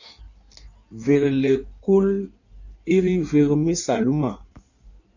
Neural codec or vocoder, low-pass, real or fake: codec, 16 kHz in and 24 kHz out, 1.1 kbps, FireRedTTS-2 codec; 7.2 kHz; fake